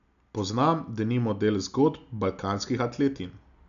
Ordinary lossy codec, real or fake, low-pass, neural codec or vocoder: none; real; 7.2 kHz; none